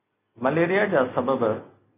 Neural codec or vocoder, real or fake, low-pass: none; real; 3.6 kHz